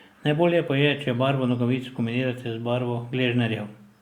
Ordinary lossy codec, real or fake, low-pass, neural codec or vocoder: Opus, 64 kbps; real; 19.8 kHz; none